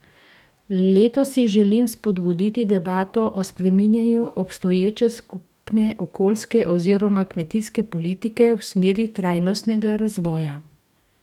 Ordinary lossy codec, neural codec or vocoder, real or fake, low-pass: none; codec, 44.1 kHz, 2.6 kbps, DAC; fake; 19.8 kHz